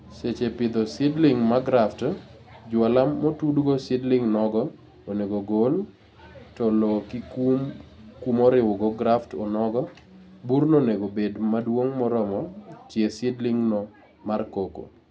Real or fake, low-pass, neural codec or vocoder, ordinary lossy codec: real; none; none; none